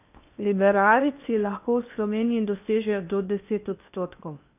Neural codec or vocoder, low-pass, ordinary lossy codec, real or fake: codec, 16 kHz in and 24 kHz out, 0.8 kbps, FocalCodec, streaming, 65536 codes; 3.6 kHz; none; fake